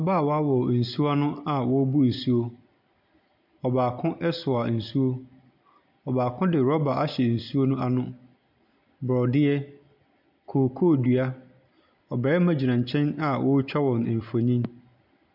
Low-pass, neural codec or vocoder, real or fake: 5.4 kHz; none; real